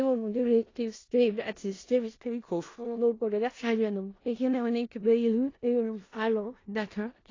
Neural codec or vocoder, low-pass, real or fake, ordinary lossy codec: codec, 16 kHz in and 24 kHz out, 0.4 kbps, LongCat-Audio-Codec, four codebook decoder; 7.2 kHz; fake; AAC, 32 kbps